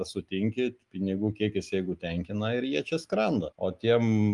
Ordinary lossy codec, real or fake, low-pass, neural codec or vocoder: Opus, 32 kbps; real; 10.8 kHz; none